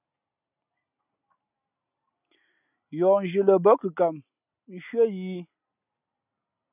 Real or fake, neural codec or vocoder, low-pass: real; none; 3.6 kHz